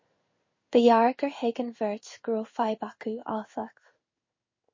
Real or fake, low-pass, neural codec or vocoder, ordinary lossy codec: fake; 7.2 kHz; codec, 16 kHz in and 24 kHz out, 1 kbps, XY-Tokenizer; MP3, 32 kbps